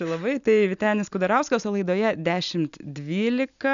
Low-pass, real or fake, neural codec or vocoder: 7.2 kHz; real; none